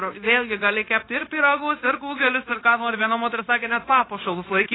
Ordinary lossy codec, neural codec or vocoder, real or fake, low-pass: AAC, 16 kbps; codec, 16 kHz, 0.9 kbps, LongCat-Audio-Codec; fake; 7.2 kHz